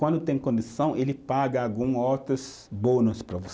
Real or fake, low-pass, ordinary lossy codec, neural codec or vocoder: real; none; none; none